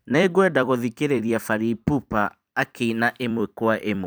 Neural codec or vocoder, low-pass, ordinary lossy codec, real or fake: vocoder, 44.1 kHz, 128 mel bands every 256 samples, BigVGAN v2; none; none; fake